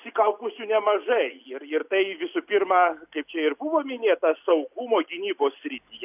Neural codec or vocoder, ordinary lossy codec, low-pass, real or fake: none; AAC, 32 kbps; 3.6 kHz; real